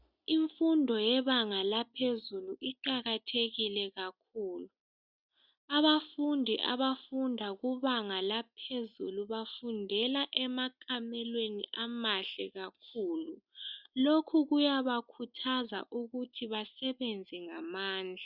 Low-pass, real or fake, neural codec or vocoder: 5.4 kHz; real; none